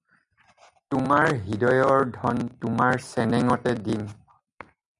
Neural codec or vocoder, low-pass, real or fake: none; 10.8 kHz; real